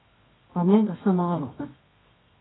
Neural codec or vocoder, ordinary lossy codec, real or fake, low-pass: codec, 24 kHz, 0.9 kbps, WavTokenizer, medium music audio release; AAC, 16 kbps; fake; 7.2 kHz